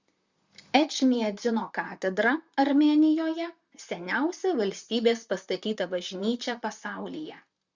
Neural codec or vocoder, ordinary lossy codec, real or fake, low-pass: vocoder, 44.1 kHz, 128 mel bands, Pupu-Vocoder; Opus, 64 kbps; fake; 7.2 kHz